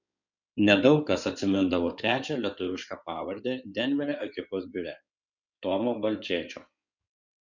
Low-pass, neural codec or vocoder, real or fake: 7.2 kHz; codec, 16 kHz in and 24 kHz out, 2.2 kbps, FireRedTTS-2 codec; fake